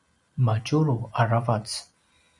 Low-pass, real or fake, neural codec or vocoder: 10.8 kHz; fake; vocoder, 44.1 kHz, 128 mel bands every 256 samples, BigVGAN v2